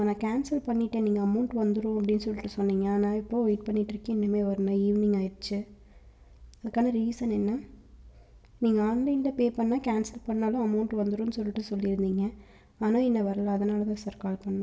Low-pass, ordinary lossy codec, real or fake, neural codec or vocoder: none; none; real; none